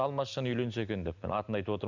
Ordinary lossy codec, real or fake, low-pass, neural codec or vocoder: none; real; 7.2 kHz; none